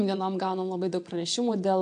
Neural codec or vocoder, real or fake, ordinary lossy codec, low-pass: vocoder, 22.05 kHz, 80 mel bands, WaveNeXt; fake; AAC, 64 kbps; 9.9 kHz